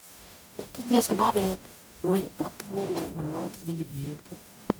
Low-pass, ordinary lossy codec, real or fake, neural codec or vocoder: none; none; fake; codec, 44.1 kHz, 0.9 kbps, DAC